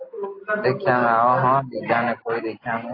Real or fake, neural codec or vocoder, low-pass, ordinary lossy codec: real; none; 5.4 kHz; AAC, 32 kbps